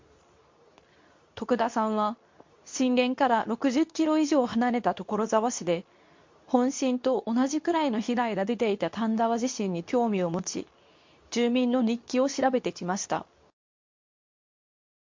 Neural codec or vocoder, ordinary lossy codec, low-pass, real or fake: codec, 24 kHz, 0.9 kbps, WavTokenizer, medium speech release version 2; MP3, 48 kbps; 7.2 kHz; fake